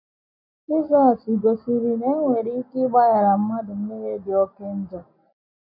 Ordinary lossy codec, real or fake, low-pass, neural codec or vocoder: none; real; 5.4 kHz; none